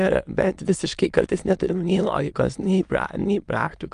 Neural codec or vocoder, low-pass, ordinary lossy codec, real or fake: autoencoder, 22.05 kHz, a latent of 192 numbers a frame, VITS, trained on many speakers; 9.9 kHz; Opus, 32 kbps; fake